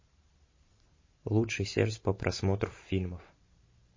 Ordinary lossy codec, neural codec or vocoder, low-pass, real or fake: MP3, 32 kbps; vocoder, 44.1 kHz, 128 mel bands every 512 samples, BigVGAN v2; 7.2 kHz; fake